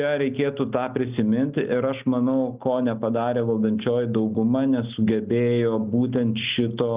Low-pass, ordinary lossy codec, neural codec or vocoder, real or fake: 3.6 kHz; Opus, 24 kbps; none; real